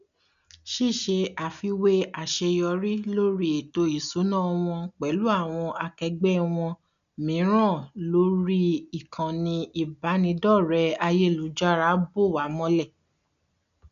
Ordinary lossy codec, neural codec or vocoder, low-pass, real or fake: none; none; 7.2 kHz; real